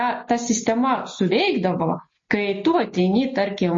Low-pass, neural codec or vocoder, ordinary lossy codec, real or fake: 7.2 kHz; none; MP3, 32 kbps; real